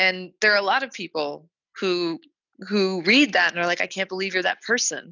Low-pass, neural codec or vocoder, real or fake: 7.2 kHz; none; real